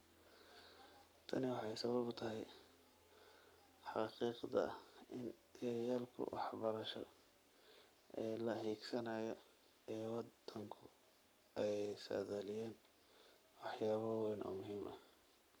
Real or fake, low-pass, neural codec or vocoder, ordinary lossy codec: fake; none; codec, 44.1 kHz, 7.8 kbps, Pupu-Codec; none